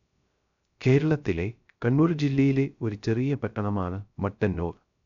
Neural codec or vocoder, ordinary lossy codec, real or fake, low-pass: codec, 16 kHz, 0.3 kbps, FocalCodec; MP3, 96 kbps; fake; 7.2 kHz